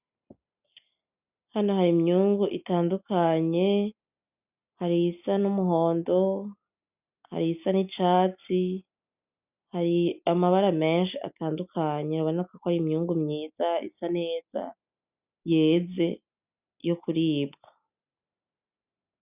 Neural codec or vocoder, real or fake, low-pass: none; real; 3.6 kHz